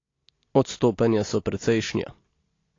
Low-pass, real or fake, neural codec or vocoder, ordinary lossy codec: 7.2 kHz; real; none; AAC, 32 kbps